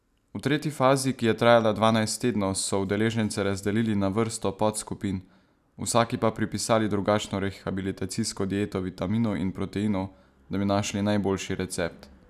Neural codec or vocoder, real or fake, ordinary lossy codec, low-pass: none; real; none; 14.4 kHz